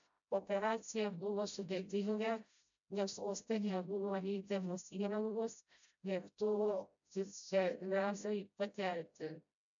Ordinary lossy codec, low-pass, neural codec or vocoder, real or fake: MP3, 64 kbps; 7.2 kHz; codec, 16 kHz, 0.5 kbps, FreqCodec, smaller model; fake